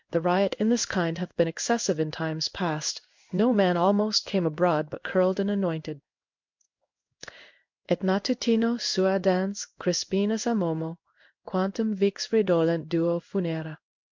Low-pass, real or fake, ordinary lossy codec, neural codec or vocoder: 7.2 kHz; fake; MP3, 64 kbps; codec, 16 kHz in and 24 kHz out, 1 kbps, XY-Tokenizer